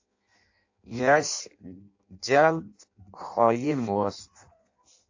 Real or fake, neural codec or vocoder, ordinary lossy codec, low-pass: fake; codec, 16 kHz in and 24 kHz out, 0.6 kbps, FireRedTTS-2 codec; AAC, 48 kbps; 7.2 kHz